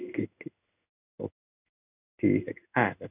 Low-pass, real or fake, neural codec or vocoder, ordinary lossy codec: 3.6 kHz; fake; codec, 16 kHz in and 24 kHz out, 1 kbps, XY-Tokenizer; none